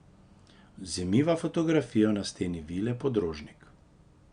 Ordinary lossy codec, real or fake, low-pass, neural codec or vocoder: Opus, 64 kbps; real; 9.9 kHz; none